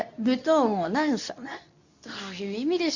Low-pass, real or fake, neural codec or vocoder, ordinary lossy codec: 7.2 kHz; fake; codec, 24 kHz, 0.9 kbps, WavTokenizer, medium speech release version 2; none